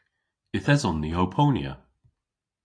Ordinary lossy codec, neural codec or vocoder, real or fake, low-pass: AAC, 48 kbps; none; real; 9.9 kHz